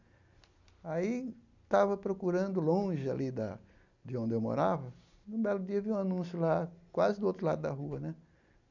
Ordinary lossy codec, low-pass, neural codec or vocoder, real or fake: none; 7.2 kHz; none; real